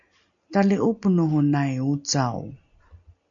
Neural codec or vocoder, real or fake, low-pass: none; real; 7.2 kHz